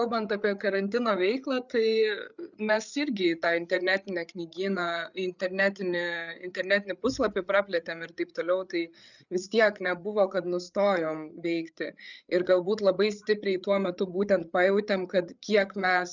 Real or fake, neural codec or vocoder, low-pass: fake; codec, 16 kHz, 8 kbps, FreqCodec, larger model; 7.2 kHz